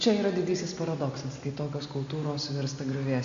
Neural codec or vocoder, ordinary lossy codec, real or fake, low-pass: none; MP3, 64 kbps; real; 7.2 kHz